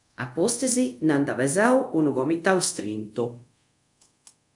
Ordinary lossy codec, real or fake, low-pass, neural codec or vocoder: MP3, 96 kbps; fake; 10.8 kHz; codec, 24 kHz, 0.5 kbps, DualCodec